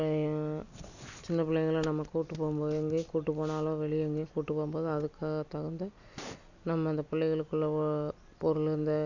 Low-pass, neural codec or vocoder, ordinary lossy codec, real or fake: 7.2 kHz; none; none; real